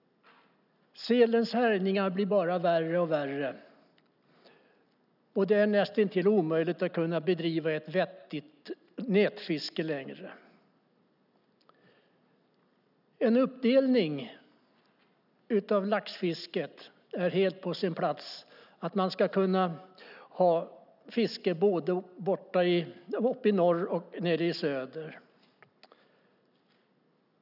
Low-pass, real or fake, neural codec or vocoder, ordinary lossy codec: 5.4 kHz; real; none; none